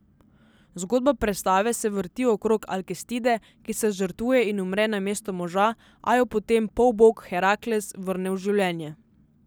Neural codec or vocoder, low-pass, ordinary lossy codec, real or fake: none; none; none; real